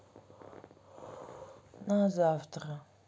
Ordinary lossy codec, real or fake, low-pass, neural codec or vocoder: none; real; none; none